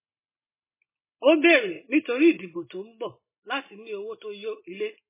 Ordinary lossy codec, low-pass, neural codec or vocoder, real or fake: MP3, 16 kbps; 3.6 kHz; none; real